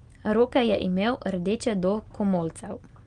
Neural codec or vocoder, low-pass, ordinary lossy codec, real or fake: none; 9.9 kHz; Opus, 24 kbps; real